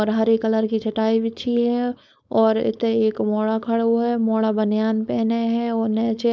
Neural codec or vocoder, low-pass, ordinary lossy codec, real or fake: codec, 16 kHz, 4.8 kbps, FACodec; none; none; fake